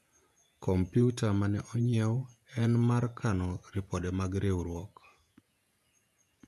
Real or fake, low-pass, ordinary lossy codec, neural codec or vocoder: fake; 14.4 kHz; none; vocoder, 48 kHz, 128 mel bands, Vocos